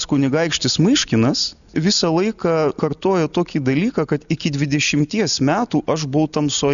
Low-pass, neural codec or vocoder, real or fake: 7.2 kHz; none; real